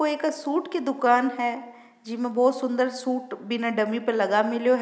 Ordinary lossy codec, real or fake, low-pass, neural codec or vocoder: none; real; none; none